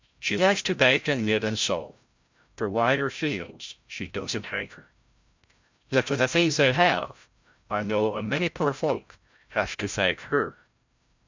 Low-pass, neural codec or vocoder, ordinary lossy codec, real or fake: 7.2 kHz; codec, 16 kHz, 0.5 kbps, FreqCodec, larger model; MP3, 64 kbps; fake